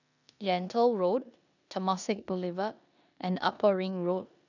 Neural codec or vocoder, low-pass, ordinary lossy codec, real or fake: codec, 16 kHz in and 24 kHz out, 0.9 kbps, LongCat-Audio-Codec, four codebook decoder; 7.2 kHz; none; fake